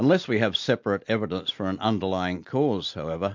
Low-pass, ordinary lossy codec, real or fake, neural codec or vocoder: 7.2 kHz; MP3, 48 kbps; real; none